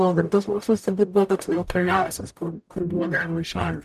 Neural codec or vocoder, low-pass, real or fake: codec, 44.1 kHz, 0.9 kbps, DAC; 14.4 kHz; fake